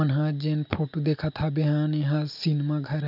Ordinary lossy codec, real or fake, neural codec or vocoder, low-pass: none; real; none; 5.4 kHz